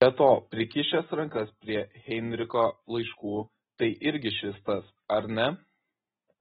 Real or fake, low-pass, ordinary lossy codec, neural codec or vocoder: real; 19.8 kHz; AAC, 16 kbps; none